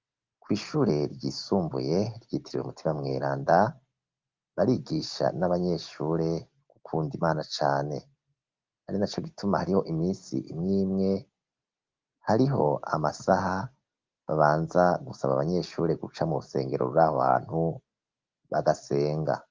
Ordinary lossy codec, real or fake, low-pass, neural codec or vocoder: Opus, 32 kbps; real; 7.2 kHz; none